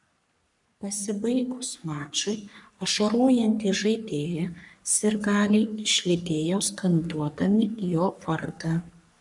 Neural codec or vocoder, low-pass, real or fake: codec, 44.1 kHz, 3.4 kbps, Pupu-Codec; 10.8 kHz; fake